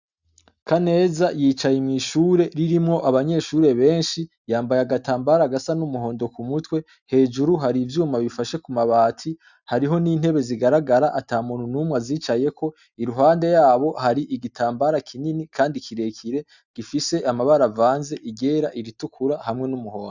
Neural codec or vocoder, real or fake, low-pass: none; real; 7.2 kHz